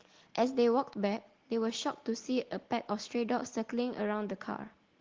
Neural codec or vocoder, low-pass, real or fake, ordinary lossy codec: none; 7.2 kHz; real; Opus, 16 kbps